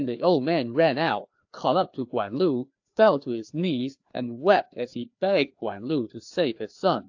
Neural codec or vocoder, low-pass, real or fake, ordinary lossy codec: codec, 16 kHz, 2 kbps, FreqCodec, larger model; 7.2 kHz; fake; AAC, 48 kbps